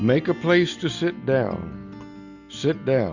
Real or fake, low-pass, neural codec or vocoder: real; 7.2 kHz; none